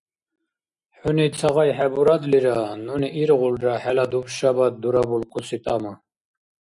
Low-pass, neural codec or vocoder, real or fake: 10.8 kHz; none; real